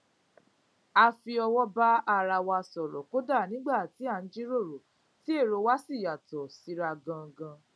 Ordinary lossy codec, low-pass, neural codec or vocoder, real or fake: none; none; none; real